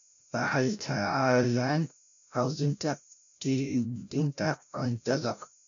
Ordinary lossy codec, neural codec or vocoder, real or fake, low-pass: none; codec, 16 kHz, 0.5 kbps, FreqCodec, larger model; fake; 7.2 kHz